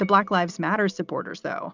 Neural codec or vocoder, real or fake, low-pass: none; real; 7.2 kHz